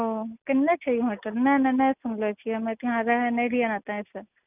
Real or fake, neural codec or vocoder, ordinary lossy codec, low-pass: real; none; none; 3.6 kHz